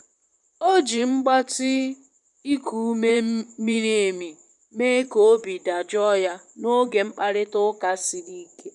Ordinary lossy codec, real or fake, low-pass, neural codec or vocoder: none; fake; 10.8 kHz; vocoder, 44.1 kHz, 128 mel bands, Pupu-Vocoder